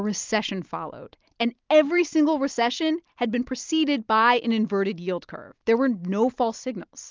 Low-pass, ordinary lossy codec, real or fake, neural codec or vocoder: 7.2 kHz; Opus, 24 kbps; real; none